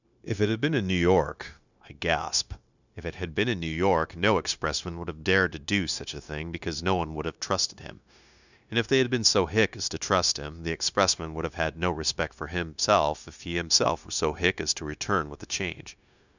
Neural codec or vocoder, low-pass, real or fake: codec, 16 kHz, 0.9 kbps, LongCat-Audio-Codec; 7.2 kHz; fake